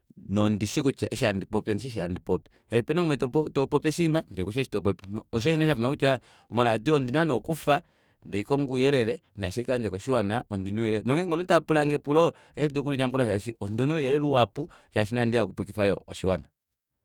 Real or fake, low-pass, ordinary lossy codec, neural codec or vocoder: fake; 19.8 kHz; none; codec, 44.1 kHz, 2.6 kbps, DAC